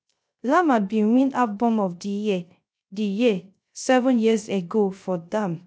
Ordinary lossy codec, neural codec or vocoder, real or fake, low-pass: none; codec, 16 kHz, 0.3 kbps, FocalCodec; fake; none